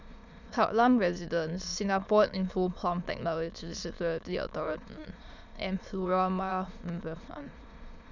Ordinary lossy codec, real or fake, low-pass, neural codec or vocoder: none; fake; 7.2 kHz; autoencoder, 22.05 kHz, a latent of 192 numbers a frame, VITS, trained on many speakers